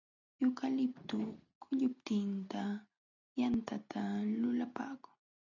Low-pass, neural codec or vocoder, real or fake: 7.2 kHz; none; real